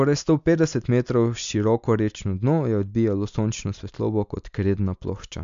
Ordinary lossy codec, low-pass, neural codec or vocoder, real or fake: MP3, 64 kbps; 7.2 kHz; none; real